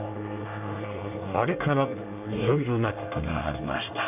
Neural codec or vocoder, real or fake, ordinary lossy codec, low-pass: codec, 24 kHz, 1 kbps, SNAC; fake; none; 3.6 kHz